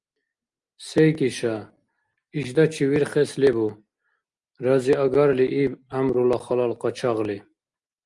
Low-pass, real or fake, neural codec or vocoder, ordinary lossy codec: 10.8 kHz; real; none; Opus, 32 kbps